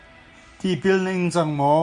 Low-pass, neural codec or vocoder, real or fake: 10.8 kHz; none; real